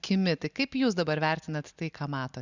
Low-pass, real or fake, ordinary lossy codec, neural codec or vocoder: 7.2 kHz; real; Opus, 64 kbps; none